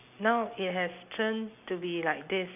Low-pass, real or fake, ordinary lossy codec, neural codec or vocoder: 3.6 kHz; real; none; none